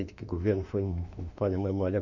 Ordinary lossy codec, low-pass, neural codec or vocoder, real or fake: none; 7.2 kHz; autoencoder, 48 kHz, 32 numbers a frame, DAC-VAE, trained on Japanese speech; fake